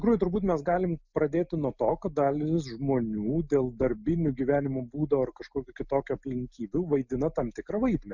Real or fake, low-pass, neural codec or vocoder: real; 7.2 kHz; none